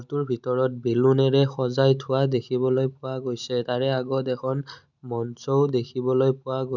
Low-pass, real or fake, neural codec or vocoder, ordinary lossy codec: 7.2 kHz; real; none; none